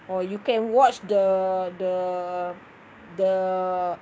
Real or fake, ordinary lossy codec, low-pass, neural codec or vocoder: fake; none; none; codec, 16 kHz, 6 kbps, DAC